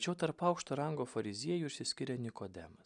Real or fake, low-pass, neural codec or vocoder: real; 10.8 kHz; none